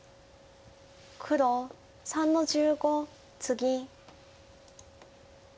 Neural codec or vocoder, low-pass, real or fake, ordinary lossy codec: none; none; real; none